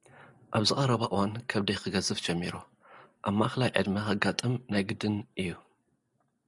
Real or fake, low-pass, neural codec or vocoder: real; 10.8 kHz; none